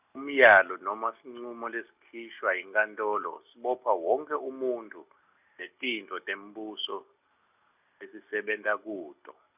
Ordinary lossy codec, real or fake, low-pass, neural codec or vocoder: none; real; 3.6 kHz; none